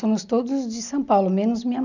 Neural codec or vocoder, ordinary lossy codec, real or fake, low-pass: none; none; real; 7.2 kHz